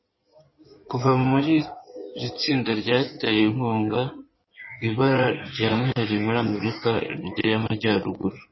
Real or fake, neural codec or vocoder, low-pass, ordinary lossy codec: fake; codec, 16 kHz in and 24 kHz out, 2.2 kbps, FireRedTTS-2 codec; 7.2 kHz; MP3, 24 kbps